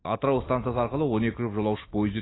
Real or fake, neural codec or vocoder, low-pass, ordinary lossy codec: real; none; 7.2 kHz; AAC, 16 kbps